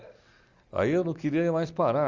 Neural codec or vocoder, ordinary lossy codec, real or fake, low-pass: none; Opus, 32 kbps; real; 7.2 kHz